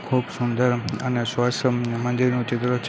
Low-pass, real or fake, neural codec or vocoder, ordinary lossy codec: none; real; none; none